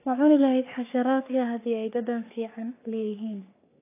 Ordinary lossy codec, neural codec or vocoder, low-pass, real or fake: AAC, 24 kbps; codec, 44.1 kHz, 3.4 kbps, Pupu-Codec; 3.6 kHz; fake